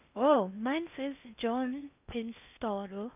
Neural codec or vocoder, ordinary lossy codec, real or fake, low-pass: codec, 16 kHz in and 24 kHz out, 0.6 kbps, FocalCodec, streaming, 4096 codes; none; fake; 3.6 kHz